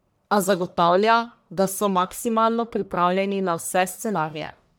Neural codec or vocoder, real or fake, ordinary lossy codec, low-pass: codec, 44.1 kHz, 1.7 kbps, Pupu-Codec; fake; none; none